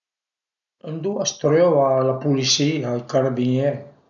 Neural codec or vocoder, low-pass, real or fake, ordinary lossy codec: none; 7.2 kHz; real; none